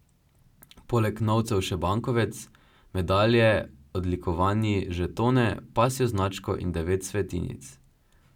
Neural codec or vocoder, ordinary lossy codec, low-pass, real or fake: none; none; 19.8 kHz; real